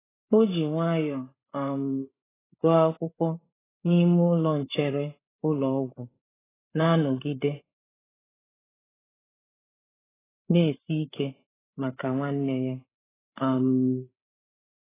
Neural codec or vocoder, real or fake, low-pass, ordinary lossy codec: codec, 16 kHz, 8 kbps, FreqCodec, larger model; fake; 3.6 kHz; AAC, 16 kbps